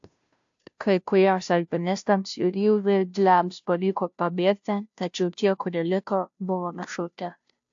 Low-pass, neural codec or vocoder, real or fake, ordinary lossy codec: 7.2 kHz; codec, 16 kHz, 0.5 kbps, FunCodec, trained on Chinese and English, 25 frames a second; fake; AAC, 64 kbps